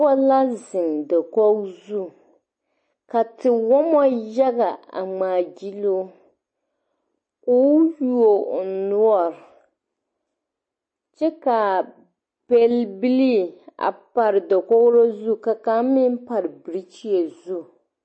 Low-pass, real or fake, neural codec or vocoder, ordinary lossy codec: 9.9 kHz; real; none; MP3, 32 kbps